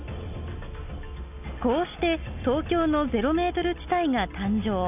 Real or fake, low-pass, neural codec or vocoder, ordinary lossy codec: real; 3.6 kHz; none; none